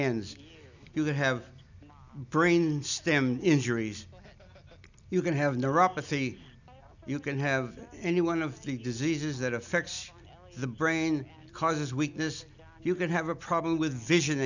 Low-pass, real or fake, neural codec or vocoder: 7.2 kHz; real; none